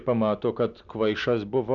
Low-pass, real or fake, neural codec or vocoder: 7.2 kHz; real; none